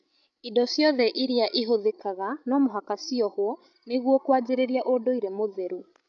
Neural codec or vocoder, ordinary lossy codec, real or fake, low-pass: codec, 16 kHz, 16 kbps, FreqCodec, larger model; none; fake; 7.2 kHz